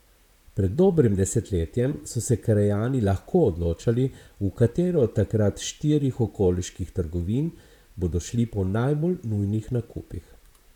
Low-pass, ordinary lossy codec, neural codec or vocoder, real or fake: 19.8 kHz; none; vocoder, 44.1 kHz, 128 mel bands, Pupu-Vocoder; fake